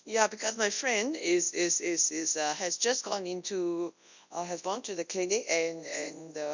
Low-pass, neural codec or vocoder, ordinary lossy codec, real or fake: 7.2 kHz; codec, 24 kHz, 0.9 kbps, WavTokenizer, large speech release; none; fake